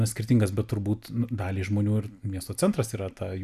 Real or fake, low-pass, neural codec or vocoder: real; 14.4 kHz; none